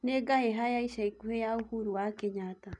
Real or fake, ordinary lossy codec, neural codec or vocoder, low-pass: real; none; none; 10.8 kHz